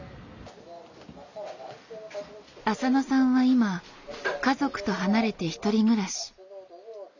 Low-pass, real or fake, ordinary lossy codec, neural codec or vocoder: 7.2 kHz; real; none; none